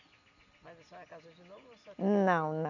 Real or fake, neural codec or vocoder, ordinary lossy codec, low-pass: real; none; none; 7.2 kHz